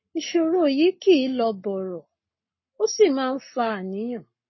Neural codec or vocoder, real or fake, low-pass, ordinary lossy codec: codec, 44.1 kHz, 7.8 kbps, Pupu-Codec; fake; 7.2 kHz; MP3, 24 kbps